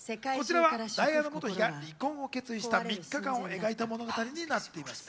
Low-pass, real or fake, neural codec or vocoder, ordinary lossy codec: none; real; none; none